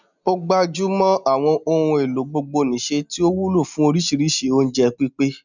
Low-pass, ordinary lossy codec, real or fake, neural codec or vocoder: 7.2 kHz; none; real; none